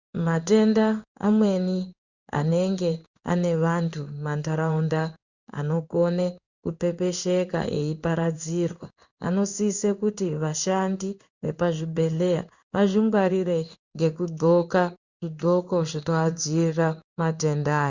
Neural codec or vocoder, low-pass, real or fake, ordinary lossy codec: codec, 16 kHz in and 24 kHz out, 1 kbps, XY-Tokenizer; 7.2 kHz; fake; Opus, 64 kbps